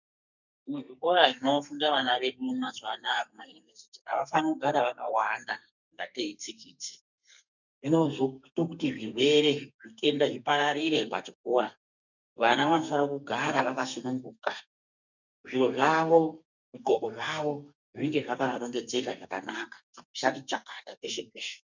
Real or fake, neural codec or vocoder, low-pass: fake; codec, 32 kHz, 1.9 kbps, SNAC; 7.2 kHz